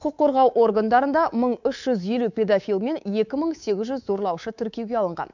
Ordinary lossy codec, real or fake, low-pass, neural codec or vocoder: none; fake; 7.2 kHz; codec, 24 kHz, 3.1 kbps, DualCodec